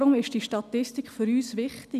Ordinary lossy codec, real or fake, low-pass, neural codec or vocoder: none; real; 14.4 kHz; none